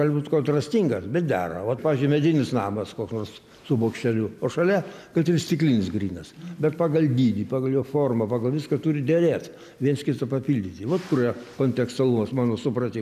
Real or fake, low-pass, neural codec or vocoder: real; 14.4 kHz; none